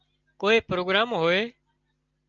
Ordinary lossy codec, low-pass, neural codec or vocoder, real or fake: Opus, 24 kbps; 7.2 kHz; none; real